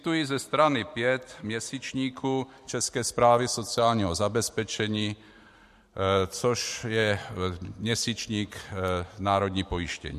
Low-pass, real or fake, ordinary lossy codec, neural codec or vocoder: 14.4 kHz; fake; MP3, 64 kbps; vocoder, 44.1 kHz, 128 mel bands every 512 samples, BigVGAN v2